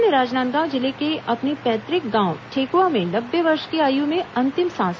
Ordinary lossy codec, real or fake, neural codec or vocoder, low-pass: none; real; none; none